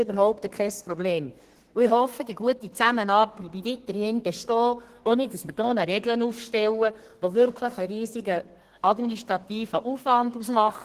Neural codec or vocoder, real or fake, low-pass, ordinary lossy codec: codec, 32 kHz, 1.9 kbps, SNAC; fake; 14.4 kHz; Opus, 16 kbps